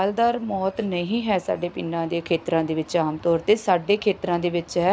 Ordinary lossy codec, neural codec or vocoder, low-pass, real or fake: none; none; none; real